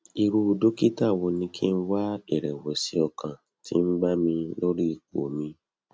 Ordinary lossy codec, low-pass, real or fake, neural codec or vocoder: none; none; real; none